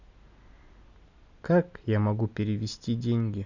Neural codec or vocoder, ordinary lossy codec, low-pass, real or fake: none; none; 7.2 kHz; real